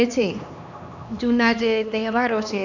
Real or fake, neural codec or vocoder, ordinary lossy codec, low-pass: fake; codec, 16 kHz, 2 kbps, X-Codec, HuBERT features, trained on LibriSpeech; none; 7.2 kHz